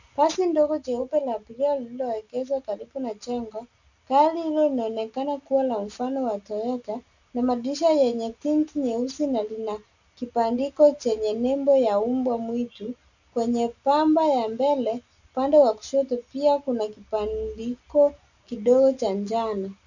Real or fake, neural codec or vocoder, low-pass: real; none; 7.2 kHz